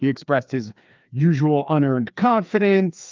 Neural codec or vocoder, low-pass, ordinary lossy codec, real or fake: codec, 16 kHz, 2 kbps, FreqCodec, larger model; 7.2 kHz; Opus, 24 kbps; fake